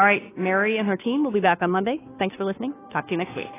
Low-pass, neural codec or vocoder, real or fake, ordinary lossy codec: 3.6 kHz; codec, 16 kHz, 2 kbps, FunCodec, trained on Chinese and English, 25 frames a second; fake; AAC, 16 kbps